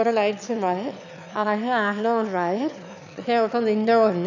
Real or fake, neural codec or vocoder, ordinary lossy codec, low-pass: fake; autoencoder, 22.05 kHz, a latent of 192 numbers a frame, VITS, trained on one speaker; none; 7.2 kHz